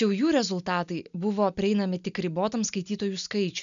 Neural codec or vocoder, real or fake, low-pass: none; real; 7.2 kHz